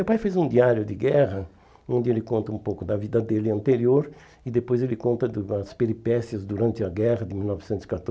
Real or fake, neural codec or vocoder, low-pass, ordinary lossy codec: real; none; none; none